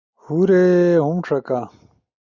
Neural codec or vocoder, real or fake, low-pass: none; real; 7.2 kHz